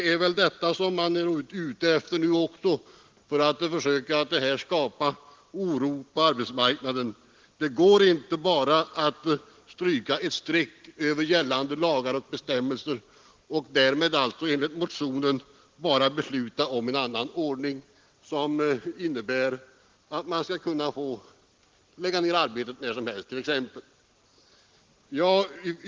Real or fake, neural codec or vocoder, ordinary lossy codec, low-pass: real; none; Opus, 16 kbps; 7.2 kHz